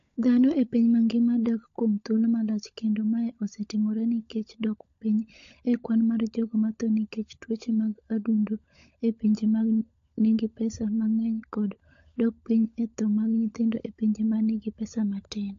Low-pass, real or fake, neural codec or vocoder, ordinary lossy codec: 7.2 kHz; fake; codec, 16 kHz, 16 kbps, FunCodec, trained on LibriTTS, 50 frames a second; AAC, 48 kbps